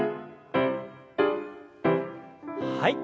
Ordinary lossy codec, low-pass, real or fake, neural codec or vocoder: none; none; real; none